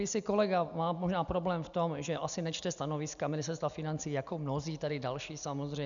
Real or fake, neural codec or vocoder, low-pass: real; none; 7.2 kHz